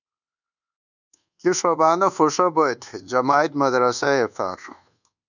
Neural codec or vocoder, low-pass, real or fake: codec, 24 kHz, 1.2 kbps, DualCodec; 7.2 kHz; fake